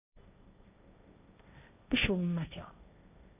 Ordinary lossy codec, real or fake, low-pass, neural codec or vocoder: none; fake; 3.6 kHz; codec, 16 kHz, 1.1 kbps, Voila-Tokenizer